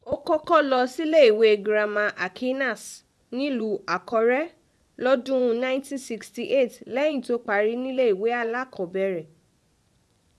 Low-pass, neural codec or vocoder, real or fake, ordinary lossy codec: none; vocoder, 24 kHz, 100 mel bands, Vocos; fake; none